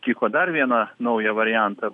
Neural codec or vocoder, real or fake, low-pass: none; real; 10.8 kHz